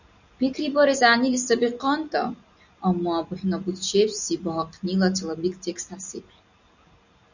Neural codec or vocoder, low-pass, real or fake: none; 7.2 kHz; real